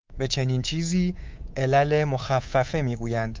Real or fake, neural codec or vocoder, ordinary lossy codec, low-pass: fake; codec, 24 kHz, 3.1 kbps, DualCodec; Opus, 24 kbps; 7.2 kHz